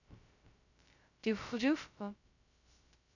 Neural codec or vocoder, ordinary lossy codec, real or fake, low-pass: codec, 16 kHz, 0.2 kbps, FocalCodec; none; fake; 7.2 kHz